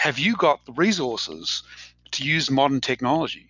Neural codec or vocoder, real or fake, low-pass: none; real; 7.2 kHz